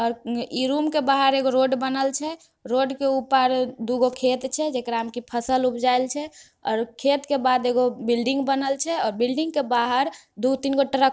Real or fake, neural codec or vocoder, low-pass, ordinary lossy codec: real; none; none; none